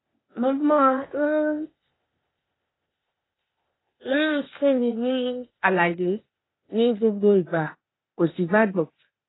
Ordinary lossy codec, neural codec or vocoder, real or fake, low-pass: AAC, 16 kbps; codec, 16 kHz, 0.8 kbps, ZipCodec; fake; 7.2 kHz